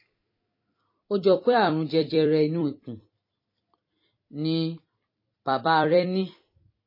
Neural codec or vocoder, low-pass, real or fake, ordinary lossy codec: vocoder, 44.1 kHz, 128 mel bands every 256 samples, BigVGAN v2; 5.4 kHz; fake; MP3, 24 kbps